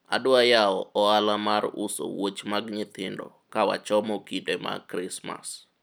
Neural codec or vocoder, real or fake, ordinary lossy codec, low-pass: none; real; none; none